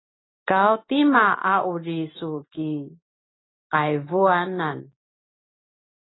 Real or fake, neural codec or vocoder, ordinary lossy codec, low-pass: real; none; AAC, 16 kbps; 7.2 kHz